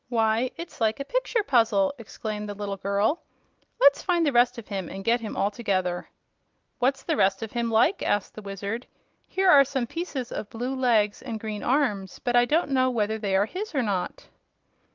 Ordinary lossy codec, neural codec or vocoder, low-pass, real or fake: Opus, 32 kbps; none; 7.2 kHz; real